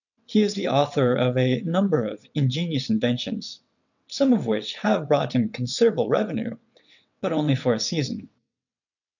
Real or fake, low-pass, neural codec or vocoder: fake; 7.2 kHz; vocoder, 22.05 kHz, 80 mel bands, WaveNeXt